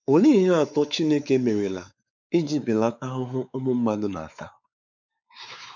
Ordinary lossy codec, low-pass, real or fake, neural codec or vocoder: none; 7.2 kHz; fake; codec, 16 kHz, 4 kbps, X-Codec, WavLM features, trained on Multilingual LibriSpeech